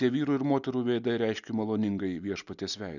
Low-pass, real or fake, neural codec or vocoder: 7.2 kHz; real; none